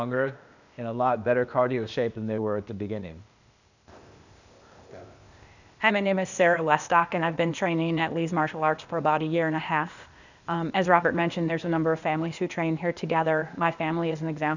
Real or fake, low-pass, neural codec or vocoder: fake; 7.2 kHz; codec, 16 kHz, 0.8 kbps, ZipCodec